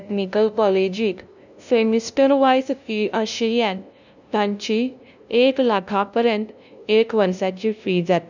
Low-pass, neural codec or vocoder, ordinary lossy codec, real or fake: 7.2 kHz; codec, 16 kHz, 0.5 kbps, FunCodec, trained on LibriTTS, 25 frames a second; none; fake